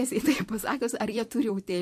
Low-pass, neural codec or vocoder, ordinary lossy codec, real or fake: 14.4 kHz; vocoder, 44.1 kHz, 128 mel bands every 256 samples, BigVGAN v2; MP3, 64 kbps; fake